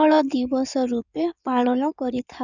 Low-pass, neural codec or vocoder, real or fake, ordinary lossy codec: 7.2 kHz; codec, 16 kHz in and 24 kHz out, 2.2 kbps, FireRedTTS-2 codec; fake; none